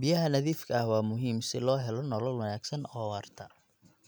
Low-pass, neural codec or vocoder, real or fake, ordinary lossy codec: none; none; real; none